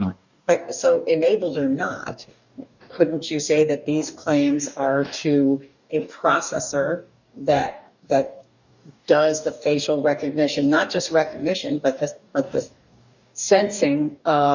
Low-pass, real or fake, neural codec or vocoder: 7.2 kHz; fake; codec, 44.1 kHz, 2.6 kbps, DAC